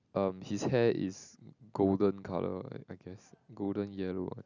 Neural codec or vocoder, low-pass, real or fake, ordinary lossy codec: none; 7.2 kHz; real; none